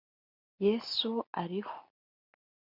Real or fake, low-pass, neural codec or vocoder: real; 5.4 kHz; none